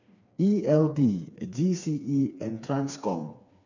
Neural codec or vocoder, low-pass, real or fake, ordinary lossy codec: codec, 16 kHz, 4 kbps, FreqCodec, smaller model; 7.2 kHz; fake; none